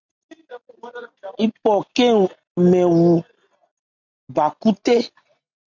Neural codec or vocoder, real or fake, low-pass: none; real; 7.2 kHz